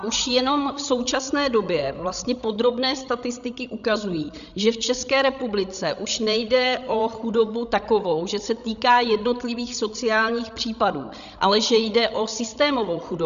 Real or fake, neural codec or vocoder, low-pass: fake; codec, 16 kHz, 16 kbps, FreqCodec, larger model; 7.2 kHz